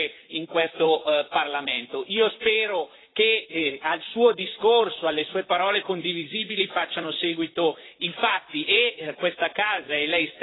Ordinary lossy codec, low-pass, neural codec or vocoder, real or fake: AAC, 16 kbps; 7.2 kHz; vocoder, 44.1 kHz, 128 mel bands, Pupu-Vocoder; fake